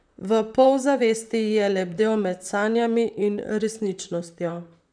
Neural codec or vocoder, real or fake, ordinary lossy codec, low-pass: vocoder, 44.1 kHz, 128 mel bands, Pupu-Vocoder; fake; none; 9.9 kHz